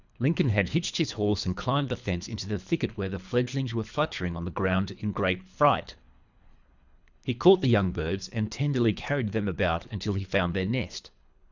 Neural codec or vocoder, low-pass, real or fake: codec, 24 kHz, 3 kbps, HILCodec; 7.2 kHz; fake